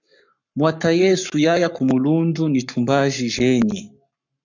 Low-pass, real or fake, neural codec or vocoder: 7.2 kHz; fake; codec, 44.1 kHz, 7.8 kbps, Pupu-Codec